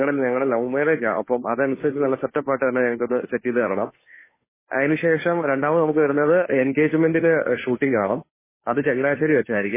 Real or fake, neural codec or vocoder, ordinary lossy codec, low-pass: fake; codec, 16 kHz, 2 kbps, FunCodec, trained on Chinese and English, 25 frames a second; MP3, 16 kbps; 3.6 kHz